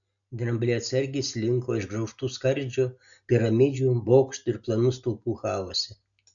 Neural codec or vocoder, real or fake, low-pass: none; real; 7.2 kHz